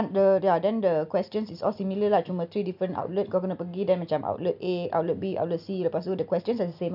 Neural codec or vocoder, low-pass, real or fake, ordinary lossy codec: none; 5.4 kHz; real; none